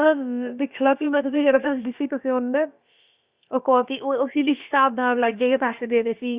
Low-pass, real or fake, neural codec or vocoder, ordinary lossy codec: 3.6 kHz; fake; codec, 16 kHz, about 1 kbps, DyCAST, with the encoder's durations; Opus, 64 kbps